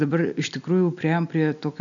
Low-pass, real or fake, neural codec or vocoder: 7.2 kHz; real; none